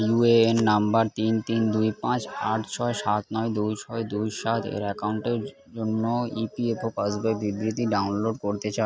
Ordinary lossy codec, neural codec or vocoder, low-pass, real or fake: none; none; none; real